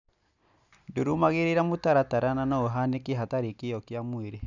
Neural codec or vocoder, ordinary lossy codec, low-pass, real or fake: none; none; 7.2 kHz; real